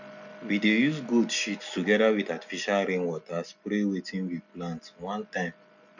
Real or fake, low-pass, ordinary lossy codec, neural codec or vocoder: real; 7.2 kHz; none; none